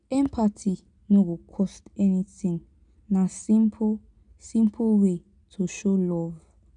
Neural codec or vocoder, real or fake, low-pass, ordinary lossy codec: none; real; 9.9 kHz; none